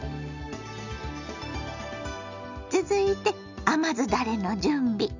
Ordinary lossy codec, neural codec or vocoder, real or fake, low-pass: none; none; real; 7.2 kHz